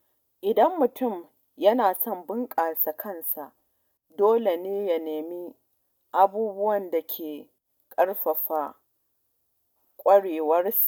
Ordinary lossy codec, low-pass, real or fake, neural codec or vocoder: none; none; real; none